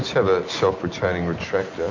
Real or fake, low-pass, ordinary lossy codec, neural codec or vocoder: real; 7.2 kHz; MP3, 48 kbps; none